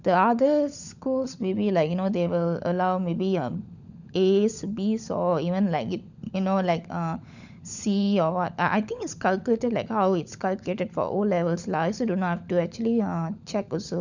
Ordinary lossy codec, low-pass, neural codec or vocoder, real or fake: none; 7.2 kHz; codec, 16 kHz, 16 kbps, FunCodec, trained on LibriTTS, 50 frames a second; fake